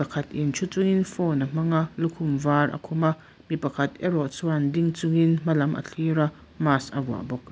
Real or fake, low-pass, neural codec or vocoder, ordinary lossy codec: real; none; none; none